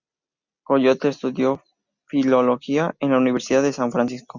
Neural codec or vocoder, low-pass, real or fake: none; 7.2 kHz; real